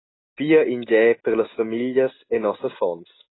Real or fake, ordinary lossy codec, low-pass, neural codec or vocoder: real; AAC, 16 kbps; 7.2 kHz; none